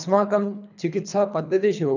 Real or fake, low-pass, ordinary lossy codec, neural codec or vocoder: fake; 7.2 kHz; none; codec, 24 kHz, 3 kbps, HILCodec